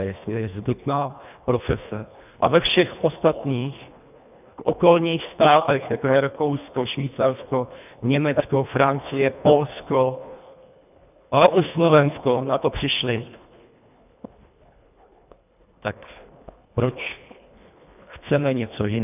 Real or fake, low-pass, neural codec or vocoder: fake; 3.6 kHz; codec, 24 kHz, 1.5 kbps, HILCodec